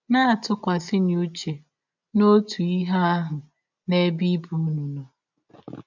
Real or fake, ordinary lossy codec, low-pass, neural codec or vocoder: real; none; 7.2 kHz; none